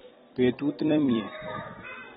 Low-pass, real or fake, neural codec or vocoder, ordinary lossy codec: 19.8 kHz; fake; vocoder, 44.1 kHz, 128 mel bands every 256 samples, BigVGAN v2; AAC, 16 kbps